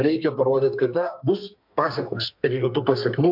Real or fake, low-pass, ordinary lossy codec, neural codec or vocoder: fake; 5.4 kHz; MP3, 48 kbps; codec, 32 kHz, 1.9 kbps, SNAC